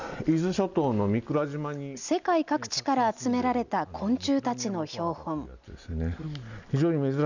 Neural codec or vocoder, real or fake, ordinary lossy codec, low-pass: none; real; none; 7.2 kHz